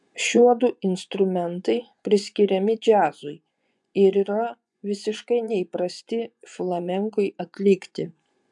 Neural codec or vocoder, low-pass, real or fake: vocoder, 24 kHz, 100 mel bands, Vocos; 10.8 kHz; fake